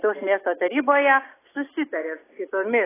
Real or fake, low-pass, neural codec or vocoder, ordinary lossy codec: real; 3.6 kHz; none; AAC, 16 kbps